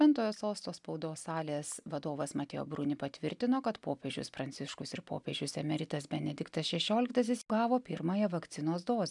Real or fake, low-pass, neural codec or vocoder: real; 10.8 kHz; none